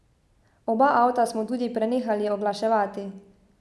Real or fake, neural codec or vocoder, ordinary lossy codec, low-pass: real; none; none; none